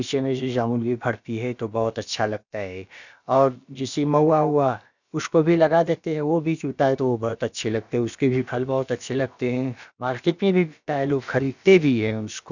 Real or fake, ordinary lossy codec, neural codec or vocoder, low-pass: fake; none; codec, 16 kHz, about 1 kbps, DyCAST, with the encoder's durations; 7.2 kHz